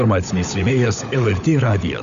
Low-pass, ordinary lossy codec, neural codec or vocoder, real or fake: 7.2 kHz; Opus, 64 kbps; codec, 16 kHz, 16 kbps, FunCodec, trained on LibriTTS, 50 frames a second; fake